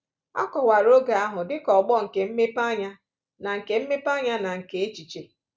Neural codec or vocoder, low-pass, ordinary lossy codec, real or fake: none; none; none; real